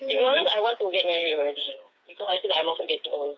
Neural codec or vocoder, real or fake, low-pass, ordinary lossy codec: codec, 16 kHz, 4 kbps, FreqCodec, smaller model; fake; none; none